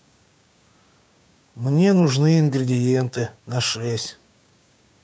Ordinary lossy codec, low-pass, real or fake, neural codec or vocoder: none; none; fake; codec, 16 kHz, 6 kbps, DAC